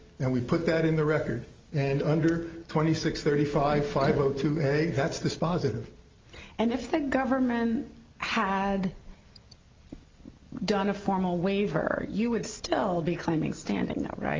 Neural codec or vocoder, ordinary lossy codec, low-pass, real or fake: none; Opus, 32 kbps; 7.2 kHz; real